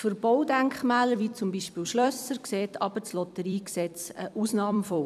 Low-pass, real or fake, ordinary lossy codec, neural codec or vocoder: 14.4 kHz; fake; none; vocoder, 44.1 kHz, 128 mel bands every 256 samples, BigVGAN v2